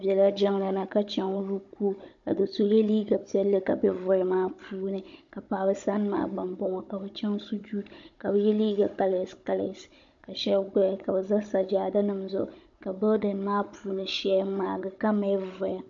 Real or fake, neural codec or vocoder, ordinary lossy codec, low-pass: fake; codec, 16 kHz, 16 kbps, FunCodec, trained on Chinese and English, 50 frames a second; MP3, 48 kbps; 7.2 kHz